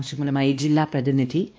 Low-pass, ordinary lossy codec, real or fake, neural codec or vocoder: none; none; fake; codec, 16 kHz, 1 kbps, X-Codec, WavLM features, trained on Multilingual LibriSpeech